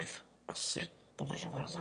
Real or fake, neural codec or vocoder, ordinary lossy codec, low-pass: fake; autoencoder, 22.05 kHz, a latent of 192 numbers a frame, VITS, trained on one speaker; MP3, 48 kbps; 9.9 kHz